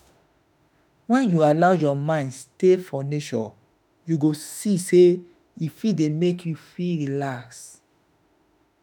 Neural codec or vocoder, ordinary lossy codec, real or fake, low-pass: autoencoder, 48 kHz, 32 numbers a frame, DAC-VAE, trained on Japanese speech; none; fake; none